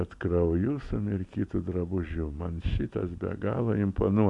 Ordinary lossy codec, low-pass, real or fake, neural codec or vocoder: MP3, 64 kbps; 10.8 kHz; real; none